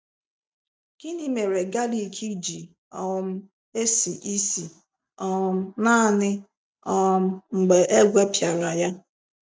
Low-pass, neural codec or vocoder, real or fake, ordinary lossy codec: none; none; real; none